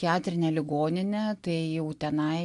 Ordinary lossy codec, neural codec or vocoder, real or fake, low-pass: AAC, 64 kbps; none; real; 10.8 kHz